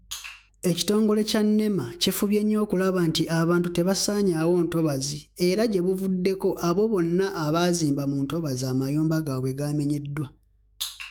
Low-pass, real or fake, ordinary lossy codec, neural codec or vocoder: none; fake; none; autoencoder, 48 kHz, 128 numbers a frame, DAC-VAE, trained on Japanese speech